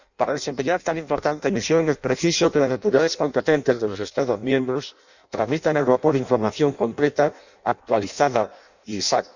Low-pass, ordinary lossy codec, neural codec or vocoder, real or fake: 7.2 kHz; none; codec, 16 kHz in and 24 kHz out, 0.6 kbps, FireRedTTS-2 codec; fake